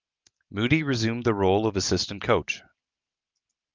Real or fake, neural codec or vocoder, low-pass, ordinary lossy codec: real; none; 7.2 kHz; Opus, 24 kbps